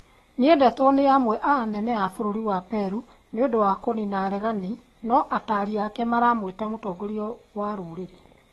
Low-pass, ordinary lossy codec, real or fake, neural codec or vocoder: 19.8 kHz; AAC, 32 kbps; fake; codec, 44.1 kHz, 7.8 kbps, DAC